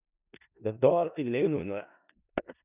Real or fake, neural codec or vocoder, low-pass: fake; codec, 16 kHz in and 24 kHz out, 0.4 kbps, LongCat-Audio-Codec, four codebook decoder; 3.6 kHz